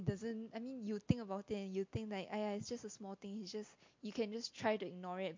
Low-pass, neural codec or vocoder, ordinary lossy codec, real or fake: 7.2 kHz; none; MP3, 48 kbps; real